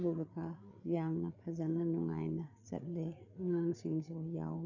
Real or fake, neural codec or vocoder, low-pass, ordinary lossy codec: fake; codec, 16 kHz, 8 kbps, FreqCodec, larger model; 7.2 kHz; none